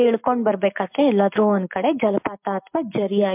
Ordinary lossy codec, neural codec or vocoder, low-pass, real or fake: MP3, 32 kbps; none; 3.6 kHz; real